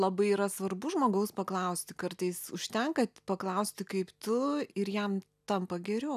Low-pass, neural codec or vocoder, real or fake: 14.4 kHz; none; real